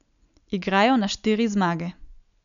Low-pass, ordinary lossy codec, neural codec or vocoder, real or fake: 7.2 kHz; none; none; real